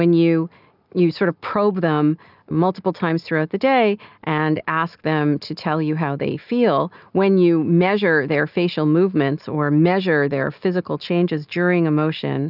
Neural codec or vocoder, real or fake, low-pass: none; real; 5.4 kHz